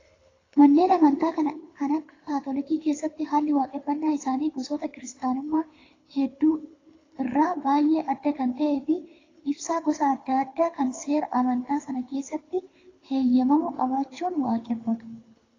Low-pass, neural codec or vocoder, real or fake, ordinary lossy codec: 7.2 kHz; codec, 24 kHz, 6 kbps, HILCodec; fake; AAC, 32 kbps